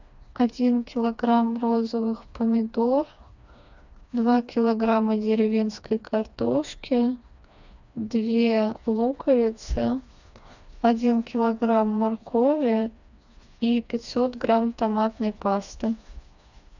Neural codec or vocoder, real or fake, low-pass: codec, 16 kHz, 2 kbps, FreqCodec, smaller model; fake; 7.2 kHz